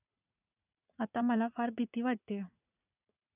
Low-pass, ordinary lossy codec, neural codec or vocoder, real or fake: 3.6 kHz; none; vocoder, 44.1 kHz, 80 mel bands, Vocos; fake